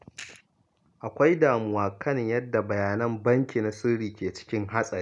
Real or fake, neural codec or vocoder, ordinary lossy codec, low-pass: real; none; none; 10.8 kHz